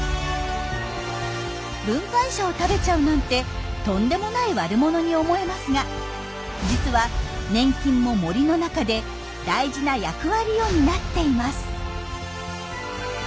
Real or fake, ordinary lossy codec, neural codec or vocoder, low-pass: real; none; none; none